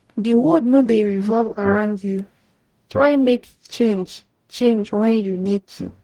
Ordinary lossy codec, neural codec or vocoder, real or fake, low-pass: Opus, 24 kbps; codec, 44.1 kHz, 0.9 kbps, DAC; fake; 19.8 kHz